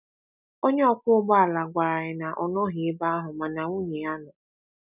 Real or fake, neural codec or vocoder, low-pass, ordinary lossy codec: real; none; 3.6 kHz; none